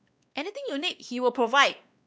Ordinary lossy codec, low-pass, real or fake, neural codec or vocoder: none; none; fake; codec, 16 kHz, 2 kbps, X-Codec, WavLM features, trained on Multilingual LibriSpeech